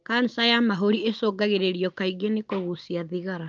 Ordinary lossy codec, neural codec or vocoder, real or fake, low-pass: Opus, 24 kbps; none; real; 7.2 kHz